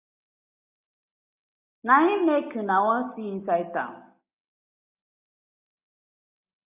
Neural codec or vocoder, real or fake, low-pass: none; real; 3.6 kHz